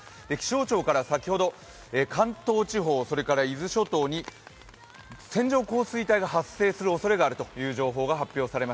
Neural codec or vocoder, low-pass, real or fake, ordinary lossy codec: none; none; real; none